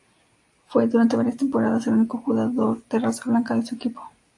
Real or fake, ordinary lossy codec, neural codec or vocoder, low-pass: real; AAC, 64 kbps; none; 10.8 kHz